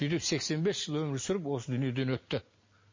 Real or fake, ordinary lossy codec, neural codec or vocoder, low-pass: real; MP3, 32 kbps; none; 7.2 kHz